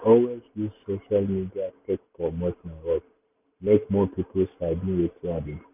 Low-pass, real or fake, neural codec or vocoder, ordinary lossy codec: 3.6 kHz; real; none; none